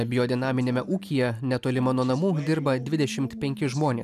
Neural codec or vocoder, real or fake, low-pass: none; real; 14.4 kHz